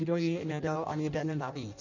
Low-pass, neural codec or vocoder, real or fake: 7.2 kHz; codec, 16 kHz in and 24 kHz out, 0.6 kbps, FireRedTTS-2 codec; fake